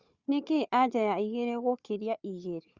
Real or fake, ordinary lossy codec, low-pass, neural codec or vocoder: fake; Opus, 64 kbps; 7.2 kHz; codec, 16 kHz, 4 kbps, FunCodec, trained on Chinese and English, 50 frames a second